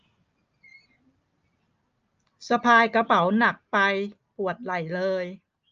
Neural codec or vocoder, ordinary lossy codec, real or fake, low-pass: none; Opus, 24 kbps; real; 7.2 kHz